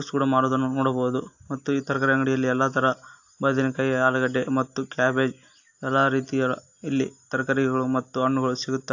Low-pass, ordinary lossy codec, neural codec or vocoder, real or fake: 7.2 kHz; AAC, 48 kbps; none; real